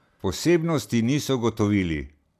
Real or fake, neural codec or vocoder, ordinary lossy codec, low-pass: real; none; none; 14.4 kHz